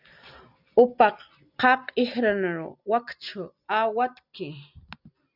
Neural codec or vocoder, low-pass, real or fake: none; 5.4 kHz; real